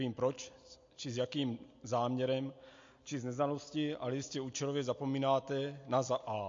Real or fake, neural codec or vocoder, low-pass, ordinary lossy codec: real; none; 7.2 kHz; MP3, 48 kbps